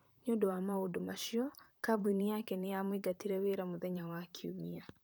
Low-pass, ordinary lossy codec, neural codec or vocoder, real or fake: none; none; vocoder, 44.1 kHz, 128 mel bands, Pupu-Vocoder; fake